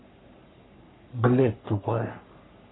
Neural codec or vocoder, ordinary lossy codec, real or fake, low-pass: codec, 44.1 kHz, 3.4 kbps, Pupu-Codec; AAC, 16 kbps; fake; 7.2 kHz